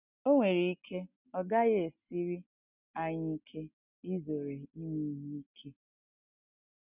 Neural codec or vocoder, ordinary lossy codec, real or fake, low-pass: none; none; real; 3.6 kHz